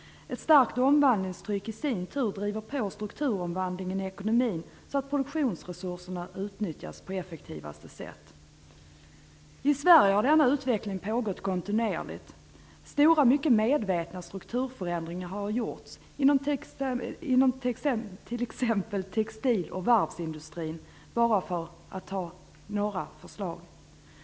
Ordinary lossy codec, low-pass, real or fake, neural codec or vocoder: none; none; real; none